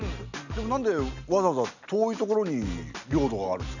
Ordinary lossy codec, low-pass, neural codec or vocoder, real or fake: none; 7.2 kHz; none; real